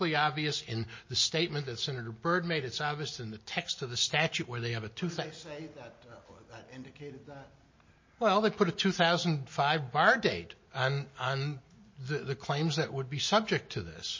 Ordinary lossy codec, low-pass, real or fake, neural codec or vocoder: MP3, 32 kbps; 7.2 kHz; real; none